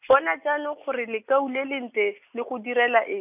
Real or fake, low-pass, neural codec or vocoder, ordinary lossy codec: real; 3.6 kHz; none; none